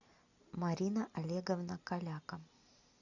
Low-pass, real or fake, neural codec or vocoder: 7.2 kHz; real; none